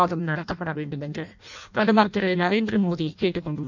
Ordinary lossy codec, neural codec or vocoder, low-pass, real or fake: none; codec, 16 kHz in and 24 kHz out, 0.6 kbps, FireRedTTS-2 codec; 7.2 kHz; fake